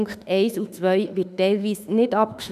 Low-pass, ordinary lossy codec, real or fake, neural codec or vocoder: 14.4 kHz; none; fake; autoencoder, 48 kHz, 32 numbers a frame, DAC-VAE, trained on Japanese speech